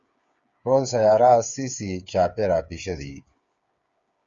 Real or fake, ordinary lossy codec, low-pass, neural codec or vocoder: fake; Opus, 64 kbps; 7.2 kHz; codec, 16 kHz, 8 kbps, FreqCodec, smaller model